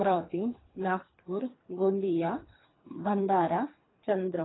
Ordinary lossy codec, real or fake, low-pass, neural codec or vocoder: AAC, 16 kbps; fake; 7.2 kHz; codec, 24 kHz, 3 kbps, HILCodec